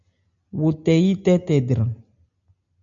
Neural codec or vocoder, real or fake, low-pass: none; real; 7.2 kHz